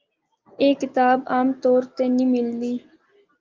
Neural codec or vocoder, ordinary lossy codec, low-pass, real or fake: none; Opus, 32 kbps; 7.2 kHz; real